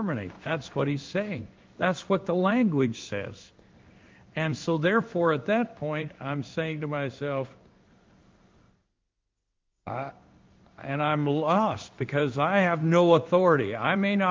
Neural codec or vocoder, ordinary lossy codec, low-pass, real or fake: codec, 16 kHz in and 24 kHz out, 1 kbps, XY-Tokenizer; Opus, 24 kbps; 7.2 kHz; fake